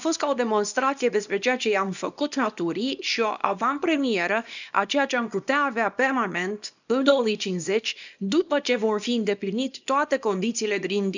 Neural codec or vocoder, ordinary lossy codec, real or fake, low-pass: codec, 24 kHz, 0.9 kbps, WavTokenizer, small release; none; fake; 7.2 kHz